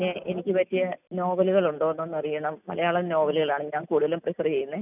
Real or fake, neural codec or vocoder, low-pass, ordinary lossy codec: real; none; 3.6 kHz; none